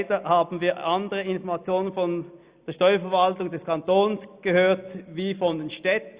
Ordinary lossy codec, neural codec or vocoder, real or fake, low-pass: Opus, 64 kbps; none; real; 3.6 kHz